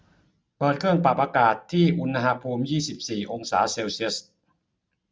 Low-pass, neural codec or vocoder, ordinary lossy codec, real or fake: none; none; none; real